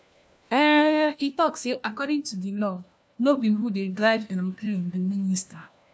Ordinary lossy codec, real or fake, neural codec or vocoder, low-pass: none; fake; codec, 16 kHz, 1 kbps, FunCodec, trained on LibriTTS, 50 frames a second; none